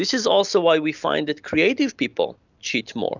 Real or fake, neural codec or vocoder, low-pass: real; none; 7.2 kHz